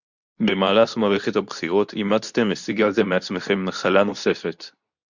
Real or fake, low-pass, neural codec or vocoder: fake; 7.2 kHz; codec, 24 kHz, 0.9 kbps, WavTokenizer, medium speech release version 2